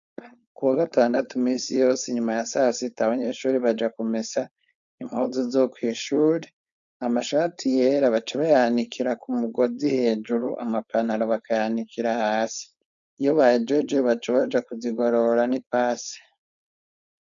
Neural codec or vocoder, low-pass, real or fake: codec, 16 kHz, 4.8 kbps, FACodec; 7.2 kHz; fake